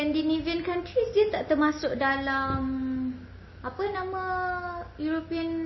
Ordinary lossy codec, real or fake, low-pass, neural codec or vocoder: MP3, 24 kbps; real; 7.2 kHz; none